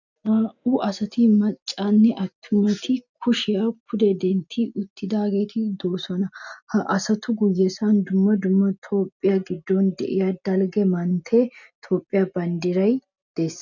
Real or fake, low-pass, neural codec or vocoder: real; 7.2 kHz; none